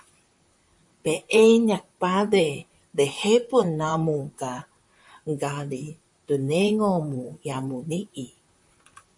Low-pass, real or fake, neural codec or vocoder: 10.8 kHz; fake; vocoder, 44.1 kHz, 128 mel bands, Pupu-Vocoder